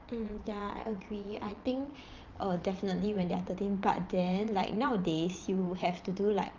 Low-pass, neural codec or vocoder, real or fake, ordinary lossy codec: 7.2 kHz; vocoder, 22.05 kHz, 80 mel bands, Vocos; fake; Opus, 24 kbps